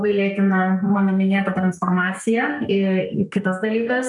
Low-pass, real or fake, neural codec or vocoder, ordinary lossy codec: 10.8 kHz; fake; codec, 32 kHz, 1.9 kbps, SNAC; MP3, 96 kbps